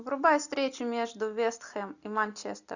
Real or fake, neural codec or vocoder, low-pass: real; none; 7.2 kHz